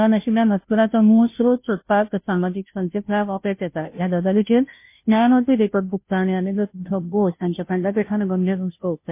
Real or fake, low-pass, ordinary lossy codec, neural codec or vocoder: fake; 3.6 kHz; MP3, 24 kbps; codec, 16 kHz, 0.5 kbps, FunCodec, trained on Chinese and English, 25 frames a second